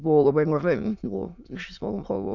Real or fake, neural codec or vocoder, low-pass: fake; autoencoder, 22.05 kHz, a latent of 192 numbers a frame, VITS, trained on many speakers; 7.2 kHz